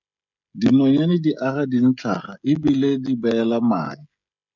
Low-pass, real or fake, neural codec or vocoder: 7.2 kHz; fake; codec, 16 kHz, 16 kbps, FreqCodec, smaller model